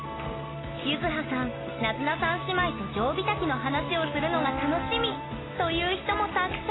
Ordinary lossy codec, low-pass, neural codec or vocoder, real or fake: AAC, 16 kbps; 7.2 kHz; none; real